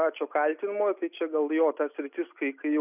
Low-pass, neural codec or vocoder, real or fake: 3.6 kHz; none; real